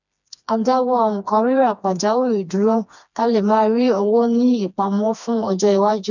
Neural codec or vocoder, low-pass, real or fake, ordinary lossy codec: codec, 16 kHz, 2 kbps, FreqCodec, smaller model; 7.2 kHz; fake; none